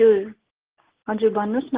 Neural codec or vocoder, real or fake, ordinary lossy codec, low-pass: none; real; Opus, 16 kbps; 3.6 kHz